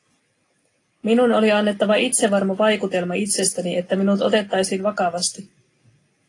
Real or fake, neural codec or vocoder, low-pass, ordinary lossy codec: real; none; 10.8 kHz; AAC, 32 kbps